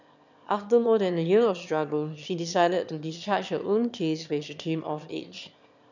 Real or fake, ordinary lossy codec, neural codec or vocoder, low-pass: fake; none; autoencoder, 22.05 kHz, a latent of 192 numbers a frame, VITS, trained on one speaker; 7.2 kHz